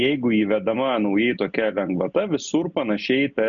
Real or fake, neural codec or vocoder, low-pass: real; none; 7.2 kHz